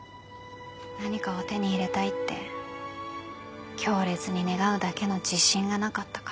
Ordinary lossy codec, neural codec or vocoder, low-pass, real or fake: none; none; none; real